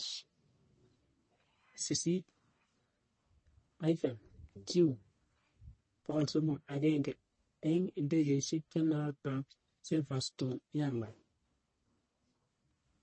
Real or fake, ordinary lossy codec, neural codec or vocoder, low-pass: fake; MP3, 32 kbps; codec, 44.1 kHz, 1.7 kbps, Pupu-Codec; 9.9 kHz